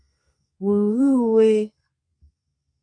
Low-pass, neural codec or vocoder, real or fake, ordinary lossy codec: 9.9 kHz; codec, 32 kHz, 1.9 kbps, SNAC; fake; MP3, 48 kbps